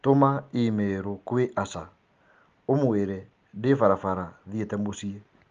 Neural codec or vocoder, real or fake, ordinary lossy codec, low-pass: none; real; Opus, 32 kbps; 7.2 kHz